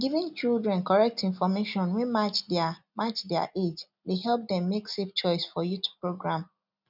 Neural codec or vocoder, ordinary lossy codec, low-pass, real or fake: none; none; 5.4 kHz; real